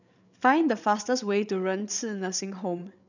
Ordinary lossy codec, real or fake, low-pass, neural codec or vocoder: none; fake; 7.2 kHz; codec, 16 kHz, 8 kbps, FreqCodec, larger model